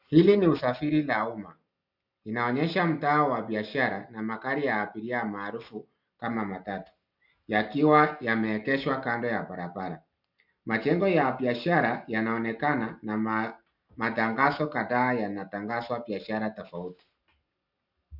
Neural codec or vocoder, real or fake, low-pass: none; real; 5.4 kHz